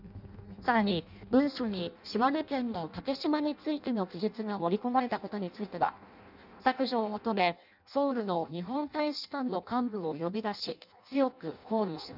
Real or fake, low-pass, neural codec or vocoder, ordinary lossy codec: fake; 5.4 kHz; codec, 16 kHz in and 24 kHz out, 0.6 kbps, FireRedTTS-2 codec; none